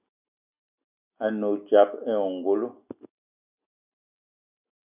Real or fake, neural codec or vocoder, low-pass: real; none; 3.6 kHz